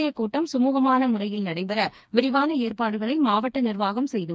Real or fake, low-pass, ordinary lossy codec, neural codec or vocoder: fake; none; none; codec, 16 kHz, 2 kbps, FreqCodec, smaller model